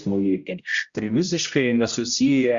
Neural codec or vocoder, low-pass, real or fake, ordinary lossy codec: codec, 16 kHz, 0.5 kbps, X-Codec, HuBERT features, trained on general audio; 7.2 kHz; fake; Opus, 64 kbps